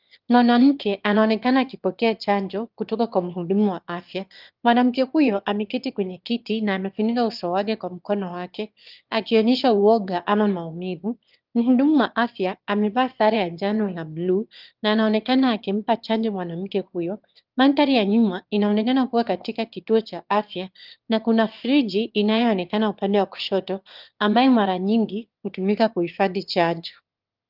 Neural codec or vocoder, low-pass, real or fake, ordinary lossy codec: autoencoder, 22.05 kHz, a latent of 192 numbers a frame, VITS, trained on one speaker; 5.4 kHz; fake; Opus, 32 kbps